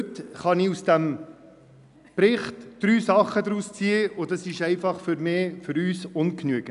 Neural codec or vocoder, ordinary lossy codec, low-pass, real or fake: none; none; 10.8 kHz; real